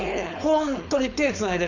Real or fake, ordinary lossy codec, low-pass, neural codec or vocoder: fake; none; 7.2 kHz; codec, 16 kHz, 4.8 kbps, FACodec